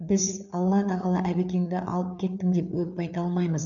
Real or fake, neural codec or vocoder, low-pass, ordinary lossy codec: fake; codec, 16 kHz, 4 kbps, FreqCodec, larger model; 7.2 kHz; none